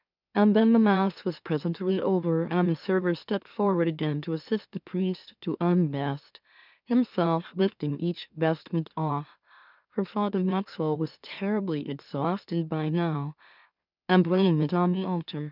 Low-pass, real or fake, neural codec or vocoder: 5.4 kHz; fake; autoencoder, 44.1 kHz, a latent of 192 numbers a frame, MeloTTS